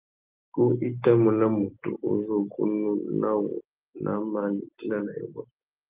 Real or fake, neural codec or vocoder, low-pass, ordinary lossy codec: real; none; 3.6 kHz; Opus, 32 kbps